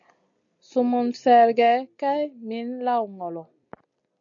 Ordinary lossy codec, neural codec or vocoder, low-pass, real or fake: MP3, 96 kbps; none; 7.2 kHz; real